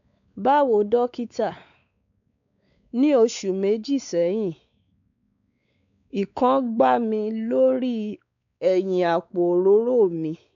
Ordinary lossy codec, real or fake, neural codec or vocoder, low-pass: none; fake; codec, 16 kHz, 4 kbps, X-Codec, WavLM features, trained on Multilingual LibriSpeech; 7.2 kHz